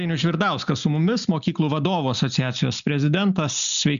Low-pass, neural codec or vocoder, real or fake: 7.2 kHz; none; real